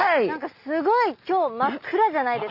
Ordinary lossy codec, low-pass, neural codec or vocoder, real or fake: Opus, 64 kbps; 5.4 kHz; none; real